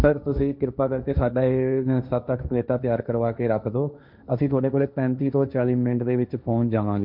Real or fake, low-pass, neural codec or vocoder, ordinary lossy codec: fake; 5.4 kHz; codec, 16 kHz, 1.1 kbps, Voila-Tokenizer; none